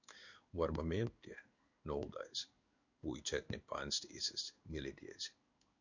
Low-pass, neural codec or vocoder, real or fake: 7.2 kHz; codec, 16 kHz in and 24 kHz out, 1 kbps, XY-Tokenizer; fake